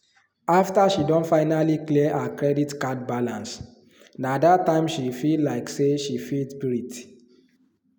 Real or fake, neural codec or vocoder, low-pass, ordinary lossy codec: real; none; none; none